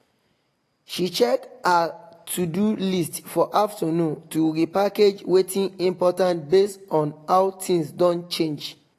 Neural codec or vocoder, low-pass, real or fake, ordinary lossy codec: none; 14.4 kHz; real; AAC, 48 kbps